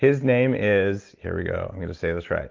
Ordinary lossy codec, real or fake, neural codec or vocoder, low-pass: Opus, 32 kbps; real; none; 7.2 kHz